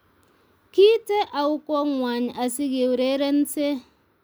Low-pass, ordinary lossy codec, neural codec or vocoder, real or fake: none; none; none; real